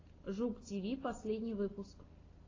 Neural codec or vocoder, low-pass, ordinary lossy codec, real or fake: none; 7.2 kHz; AAC, 32 kbps; real